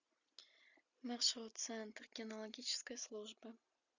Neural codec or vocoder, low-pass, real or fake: none; 7.2 kHz; real